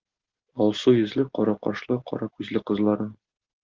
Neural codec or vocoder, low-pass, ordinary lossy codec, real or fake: none; 7.2 kHz; Opus, 16 kbps; real